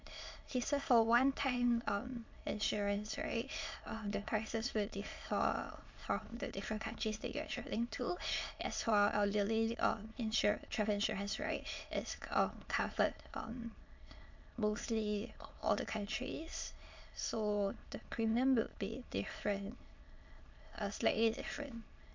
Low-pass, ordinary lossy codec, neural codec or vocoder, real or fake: 7.2 kHz; MP3, 48 kbps; autoencoder, 22.05 kHz, a latent of 192 numbers a frame, VITS, trained on many speakers; fake